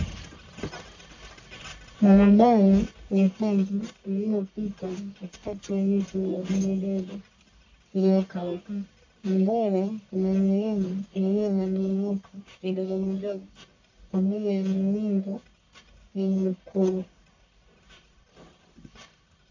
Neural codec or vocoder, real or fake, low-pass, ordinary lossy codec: codec, 44.1 kHz, 1.7 kbps, Pupu-Codec; fake; 7.2 kHz; AAC, 48 kbps